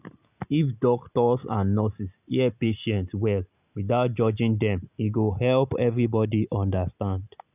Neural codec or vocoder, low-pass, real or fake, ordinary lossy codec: none; 3.6 kHz; real; none